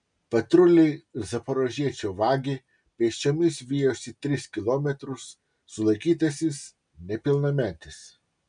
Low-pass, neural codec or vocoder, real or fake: 9.9 kHz; none; real